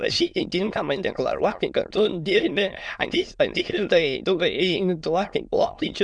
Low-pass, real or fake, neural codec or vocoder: 9.9 kHz; fake; autoencoder, 22.05 kHz, a latent of 192 numbers a frame, VITS, trained on many speakers